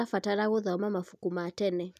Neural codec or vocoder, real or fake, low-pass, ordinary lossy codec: none; real; 14.4 kHz; none